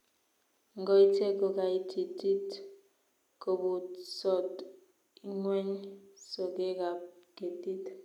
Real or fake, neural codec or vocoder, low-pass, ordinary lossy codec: real; none; 19.8 kHz; none